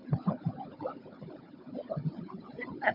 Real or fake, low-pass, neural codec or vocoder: fake; 5.4 kHz; codec, 16 kHz, 16 kbps, FunCodec, trained on Chinese and English, 50 frames a second